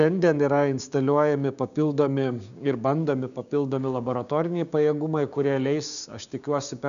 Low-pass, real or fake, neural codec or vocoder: 7.2 kHz; fake; codec, 16 kHz, 6 kbps, DAC